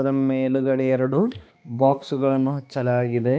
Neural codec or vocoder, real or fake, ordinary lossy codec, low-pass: codec, 16 kHz, 2 kbps, X-Codec, HuBERT features, trained on balanced general audio; fake; none; none